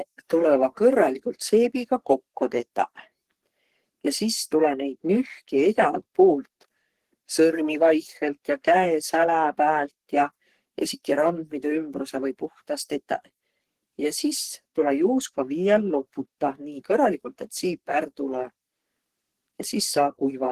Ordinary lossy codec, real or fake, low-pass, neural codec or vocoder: Opus, 16 kbps; fake; 14.4 kHz; codec, 44.1 kHz, 3.4 kbps, Pupu-Codec